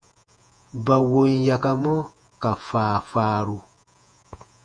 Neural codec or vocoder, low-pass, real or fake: vocoder, 48 kHz, 128 mel bands, Vocos; 9.9 kHz; fake